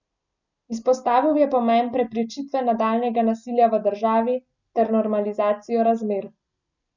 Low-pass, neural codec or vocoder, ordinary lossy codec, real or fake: 7.2 kHz; none; none; real